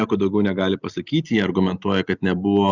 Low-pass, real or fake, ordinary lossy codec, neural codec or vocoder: 7.2 kHz; real; Opus, 64 kbps; none